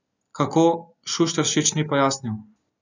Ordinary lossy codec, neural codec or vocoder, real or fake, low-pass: none; none; real; 7.2 kHz